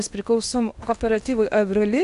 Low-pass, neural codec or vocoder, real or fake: 10.8 kHz; codec, 16 kHz in and 24 kHz out, 0.8 kbps, FocalCodec, streaming, 65536 codes; fake